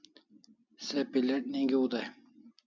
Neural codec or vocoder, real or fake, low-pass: none; real; 7.2 kHz